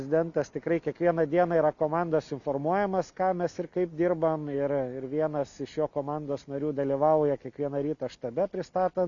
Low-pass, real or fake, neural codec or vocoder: 7.2 kHz; real; none